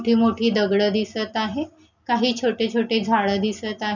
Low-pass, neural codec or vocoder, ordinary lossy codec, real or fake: 7.2 kHz; none; none; real